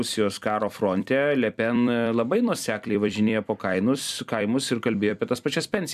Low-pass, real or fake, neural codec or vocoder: 14.4 kHz; fake; vocoder, 44.1 kHz, 128 mel bands every 256 samples, BigVGAN v2